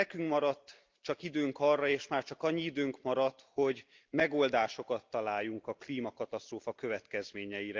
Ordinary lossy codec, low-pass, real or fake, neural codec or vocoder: Opus, 24 kbps; 7.2 kHz; real; none